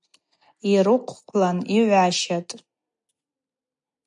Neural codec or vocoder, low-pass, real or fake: none; 10.8 kHz; real